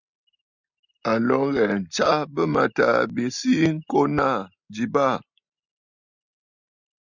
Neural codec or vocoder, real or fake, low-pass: none; real; 7.2 kHz